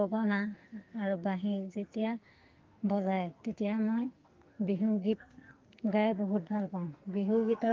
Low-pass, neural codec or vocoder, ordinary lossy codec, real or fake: 7.2 kHz; codec, 44.1 kHz, 2.6 kbps, SNAC; Opus, 32 kbps; fake